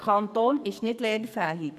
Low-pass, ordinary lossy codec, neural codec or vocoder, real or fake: 14.4 kHz; none; codec, 44.1 kHz, 2.6 kbps, SNAC; fake